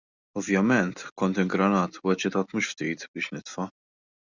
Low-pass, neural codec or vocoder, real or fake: 7.2 kHz; none; real